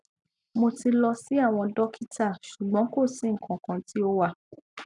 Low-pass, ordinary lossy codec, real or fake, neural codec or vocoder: 10.8 kHz; none; real; none